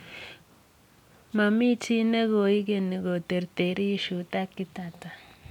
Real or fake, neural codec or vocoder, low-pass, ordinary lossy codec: real; none; 19.8 kHz; none